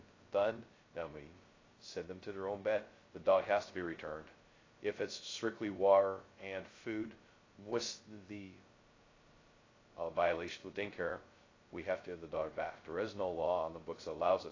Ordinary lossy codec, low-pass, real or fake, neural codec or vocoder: AAC, 32 kbps; 7.2 kHz; fake; codec, 16 kHz, 0.2 kbps, FocalCodec